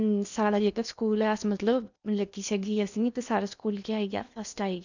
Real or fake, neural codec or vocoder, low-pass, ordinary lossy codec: fake; codec, 16 kHz in and 24 kHz out, 0.8 kbps, FocalCodec, streaming, 65536 codes; 7.2 kHz; none